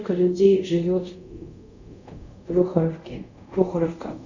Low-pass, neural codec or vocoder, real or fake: 7.2 kHz; codec, 24 kHz, 0.5 kbps, DualCodec; fake